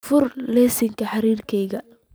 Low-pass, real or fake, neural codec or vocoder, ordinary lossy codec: none; real; none; none